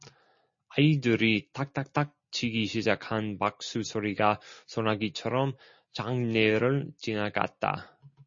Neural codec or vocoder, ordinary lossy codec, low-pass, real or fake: none; MP3, 32 kbps; 7.2 kHz; real